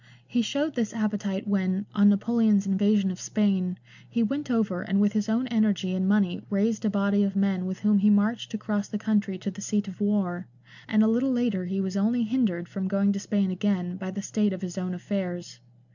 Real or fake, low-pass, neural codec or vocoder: real; 7.2 kHz; none